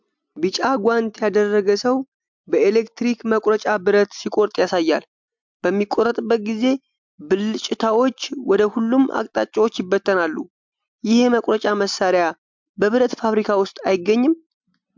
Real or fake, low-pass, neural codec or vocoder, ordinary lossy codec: real; 7.2 kHz; none; MP3, 64 kbps